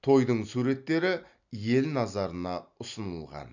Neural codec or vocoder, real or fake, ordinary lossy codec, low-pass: none; real; none; 7.2 kHz